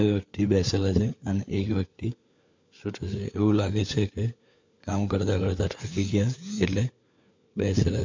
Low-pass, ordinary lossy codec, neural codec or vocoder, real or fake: 7.2 kHz; MP3, 48 kbps; codec, 16 kHz, 4 kbps, FunCodec, trained on LibriTTS, 50 frames a second; fake